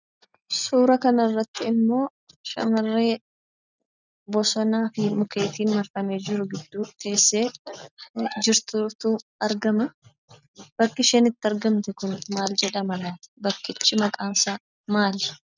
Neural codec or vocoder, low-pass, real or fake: none; 7.2 kHz; real